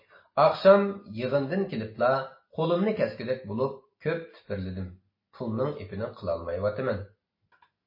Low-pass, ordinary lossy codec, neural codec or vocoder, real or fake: 5.4 kHz; MP3, 24 kbps; none; real